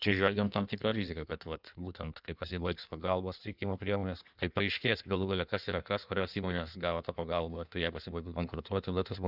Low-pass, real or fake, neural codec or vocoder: 5.4 kHz; fake; codec, 16 kHz in and 24 kHz out, 1.1 kbps, FireRedTTS-2 codec